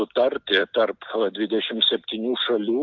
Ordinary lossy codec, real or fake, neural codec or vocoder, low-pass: Opus, 32 kbps; real; none; 7.2 kHz